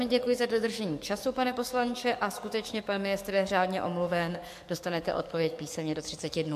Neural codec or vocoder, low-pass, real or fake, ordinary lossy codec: codec, 44.1 kHz, 7.8 kbps, DAC; 14.4 kHz; fake; MP3, 64 kbps